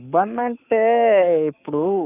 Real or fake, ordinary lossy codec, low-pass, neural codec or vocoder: fake; none; 3.6 kHz; codec, 44.1 kHz, 7.8 kbps, Pupu-Codec